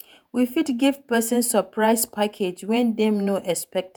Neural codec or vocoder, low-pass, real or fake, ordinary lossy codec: vocoder, 48 kHz, 128 mel bands, Vocos; none; fake; none